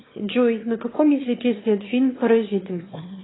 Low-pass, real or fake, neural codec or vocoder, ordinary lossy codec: 7.2 kHz; fake; autoencoder, 22.05 kHz, a latent of 192 numbers a frame, VITS, trained on one speaker; AAC, 16 kbps